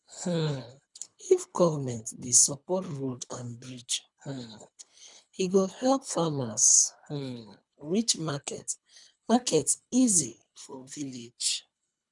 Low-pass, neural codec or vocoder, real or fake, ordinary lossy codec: 10.8 kHz; codec, 24 kHz, 3 kbps, HILCodec; fake; none